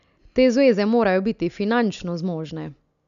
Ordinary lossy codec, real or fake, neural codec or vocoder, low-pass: none; real; none; 7.2 kHz